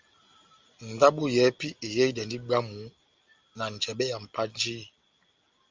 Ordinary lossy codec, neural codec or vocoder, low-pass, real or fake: Opus, 32 kbps; none; 7.2 kHz; real